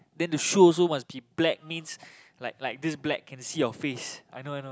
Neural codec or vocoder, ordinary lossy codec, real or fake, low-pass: none; none; real; none